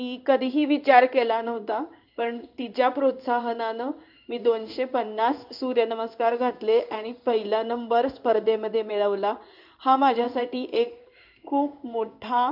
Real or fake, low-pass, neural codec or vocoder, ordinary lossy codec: fake; 5.4 kHz; codec, 16 kHz in and 24 kHz out, 1 kbps, XY-Tokenizer; none